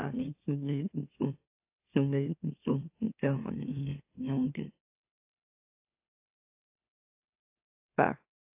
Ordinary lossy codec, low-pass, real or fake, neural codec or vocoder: AAC, 32 kbps; 3.6 kHz; fake; autoencoder, 44.1 kHz, a latent of 192 numbers a frame, MeloTTS